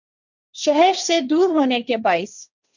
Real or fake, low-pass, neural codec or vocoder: fake; 7.2 kHz; codec, 16 kHz, 1.1 kbps, Voila-Tokenizer